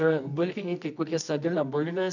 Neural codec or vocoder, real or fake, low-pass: codec, 24 kHz, 0.9 kbps, WavTokenizer, medium music audio release; fake; 7.2 kHz